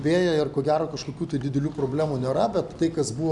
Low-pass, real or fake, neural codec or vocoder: 10.8 kHz; real; none